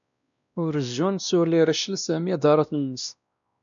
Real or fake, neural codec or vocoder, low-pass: fake; codec, 16 kHz, 1 kbps, X-Codec, WavLM features, trained on Multilingual LibriSpeech; 7.2 kHz